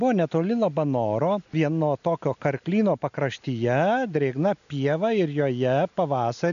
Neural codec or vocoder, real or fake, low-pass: none; real; 7.2 kHz